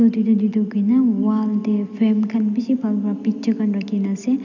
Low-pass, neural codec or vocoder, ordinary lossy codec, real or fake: 7.2 kHz; none; none; real